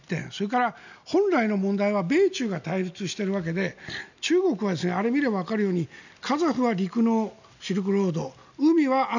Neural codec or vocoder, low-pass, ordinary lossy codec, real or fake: none; 7.2 kHz; none; real